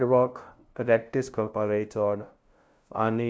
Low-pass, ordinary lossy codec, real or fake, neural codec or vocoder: none; none; fake; codec, 16 kHz, 0.5 kbps, FunCodec, trained on LibriTTS, 25 frames a second